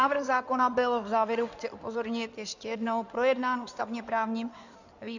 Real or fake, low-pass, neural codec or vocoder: fake; 7.2 kHz; codec, 16 kHz in and 24 kHz out, 2.2 kbps, FireRedTTS-2 codec